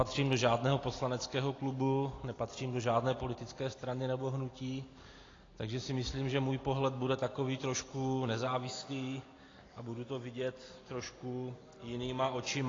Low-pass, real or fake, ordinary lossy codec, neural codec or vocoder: 7.2 kHz; real; AAC, 32 kbps; none